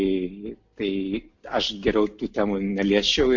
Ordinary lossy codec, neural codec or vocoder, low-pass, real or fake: MP3, 48 kbps; none; 7.2 kHz; real